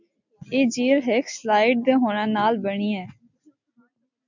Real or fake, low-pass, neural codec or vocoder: real; 7.2 kHz; none